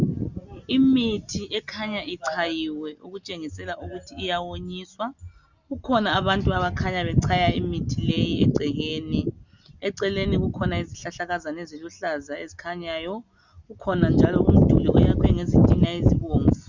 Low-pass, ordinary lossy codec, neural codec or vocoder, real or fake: 7.2 kHz; Opus, 64 kbps; none; real